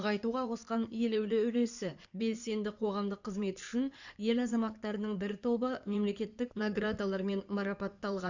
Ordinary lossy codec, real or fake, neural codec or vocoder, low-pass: none; fake; codec, 16 kHz in and 24 kHz out, 2.2 kbps, FireRedTTS-2 codec; 7.2 kHz